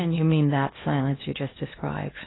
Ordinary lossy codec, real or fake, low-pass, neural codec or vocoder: AAC, 16 kbps; fake; 7.2 kHz; codec, 16 kHz in and 24 kHz out, 0.8 kbps, FocalCodec, streaming, 65536 codes